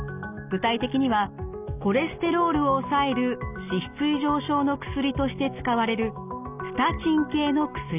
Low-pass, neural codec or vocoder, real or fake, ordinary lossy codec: 3.6 kHz; none; real; none